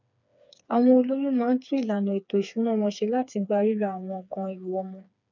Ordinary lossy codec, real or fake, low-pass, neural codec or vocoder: none; fake; 7.2 kHz; codec, 16 kHz, 4 kbps, FreqCodec, smaller model